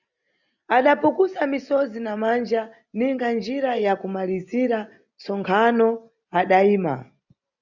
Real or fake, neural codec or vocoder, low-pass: real; none; 7.2 kHz